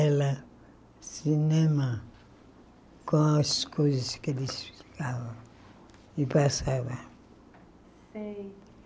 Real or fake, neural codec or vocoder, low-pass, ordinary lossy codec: real; none; none; none